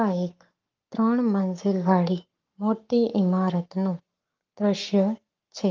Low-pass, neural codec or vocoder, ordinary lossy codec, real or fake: 7.2 kHz; codec, 44.1 kHz, 7.8 kbps, Pupu-Codec; Opus, 32 kbps; fake